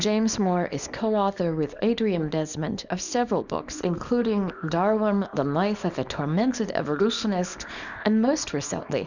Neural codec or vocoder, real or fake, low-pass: codec, 24 kHz, 0.9 kbps, WavTokenizer, small release; fake; 7.2 kHz